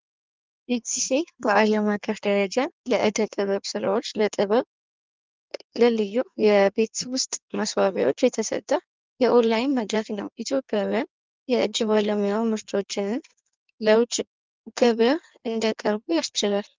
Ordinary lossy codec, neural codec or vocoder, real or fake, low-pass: Opus, 24 kbps; codec, 16 kHz in and 24 kHz out, 1.1 kbps, FireRedTTS-2 codec; fake; 7.2 kHz